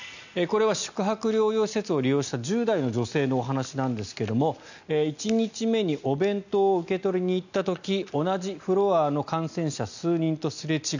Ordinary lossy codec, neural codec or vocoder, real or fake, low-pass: none; none; real; 7.2 kHz